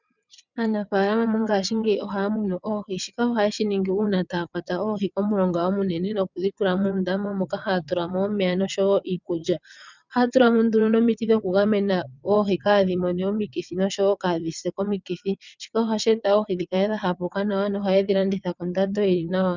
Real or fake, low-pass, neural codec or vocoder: fake; 7.2 kHz; vocoder, 22.05 kHz, 80 mel bands, WaveNeXt